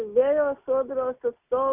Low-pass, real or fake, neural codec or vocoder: 3.6 kHz; real; none